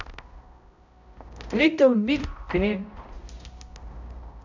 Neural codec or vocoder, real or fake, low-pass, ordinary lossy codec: codec, 16 kHz, 0.5 kbps, X-Codec, HuBERT features, trained on balanced general audio; fake; 7.2 kHz; none